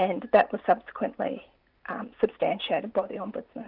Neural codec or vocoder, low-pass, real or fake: none; 5.4 kHz; real